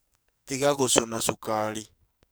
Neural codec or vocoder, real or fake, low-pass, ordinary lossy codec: codec, 44.1 kHz, 2.6 kbps, SNAC; fake; none; none